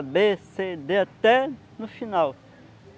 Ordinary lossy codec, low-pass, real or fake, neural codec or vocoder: none; none; real; none